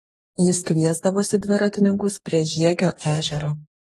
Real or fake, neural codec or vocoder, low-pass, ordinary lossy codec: fake; codec, 44.1 kHz, 2.6 kbps, DAC; 19.8 kHz; AAC, 32 kbps